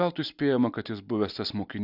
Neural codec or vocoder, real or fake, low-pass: none; real; 5.4 kHz